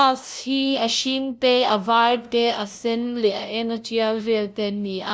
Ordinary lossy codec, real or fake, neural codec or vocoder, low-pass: none; fake; codec, 16 kHz, 0.5 kbps, FunCodec, trained on LibriTTS, 25 frames a second; none